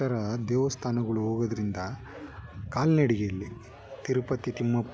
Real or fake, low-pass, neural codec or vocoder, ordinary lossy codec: real; none; none; none